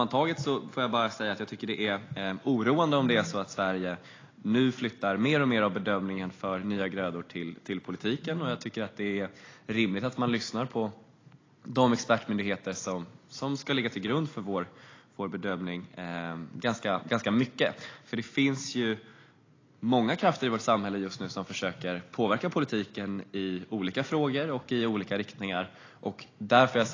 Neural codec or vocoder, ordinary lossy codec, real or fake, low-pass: none; AAC, 32 kbps; real; 7.2 kHz